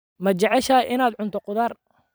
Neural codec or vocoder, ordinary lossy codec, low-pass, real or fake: vocoder, 44.1 kHz, 128 mel bands every 256 samples, BigVGAN v2; none; none; fake